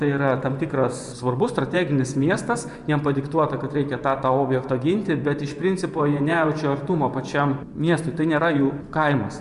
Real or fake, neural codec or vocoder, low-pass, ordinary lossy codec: fake; vocoder, 24 kHz, 100 mel bands, Vocos; 10.8 kHz; Opus, 64 kbps